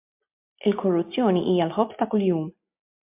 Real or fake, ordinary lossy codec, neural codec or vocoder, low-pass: real; MP3, 32 kbps; none; 3.6 kHz